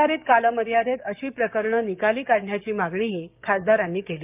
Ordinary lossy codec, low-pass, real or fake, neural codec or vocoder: none; 3.6 kHz; fake; codec, 16 kHz, 6 kbps, DAC